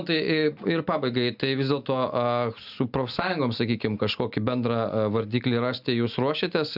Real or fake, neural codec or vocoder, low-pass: real; none; 5.4 kHz